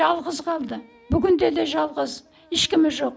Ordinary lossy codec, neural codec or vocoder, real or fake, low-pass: none; none; real; none